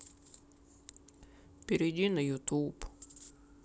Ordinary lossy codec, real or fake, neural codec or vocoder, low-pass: none; real; none; none